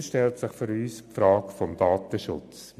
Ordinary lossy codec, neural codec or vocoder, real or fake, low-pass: AAC, 96 kbps; none; real; 14.4 kHz